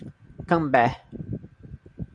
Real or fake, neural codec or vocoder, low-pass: real; none; 9.9 kHz